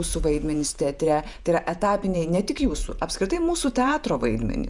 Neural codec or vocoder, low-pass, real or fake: vocoder, 48 kHz, 128 mel bands, Vocos; 10.8 kHz; fake